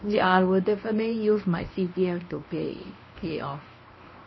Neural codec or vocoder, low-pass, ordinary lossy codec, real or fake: codec, 24 kHz, 0.9 kbps, WavTokenizer, medium speech release version 1; 7.2 kHz; MP3, 24 kbps; fake